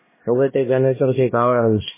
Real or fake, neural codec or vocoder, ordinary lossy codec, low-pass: fake; codec, 16 kHz, 1 kbps, X-Codec, HuBERT features, trained on LibriSpeech; MP3, 16 kbps; 3.6 kHz